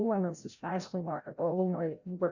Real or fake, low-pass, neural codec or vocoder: fake; 7.2 kHz; codec, 16 kHz, 0.5 kbps, FreqCodec, larger model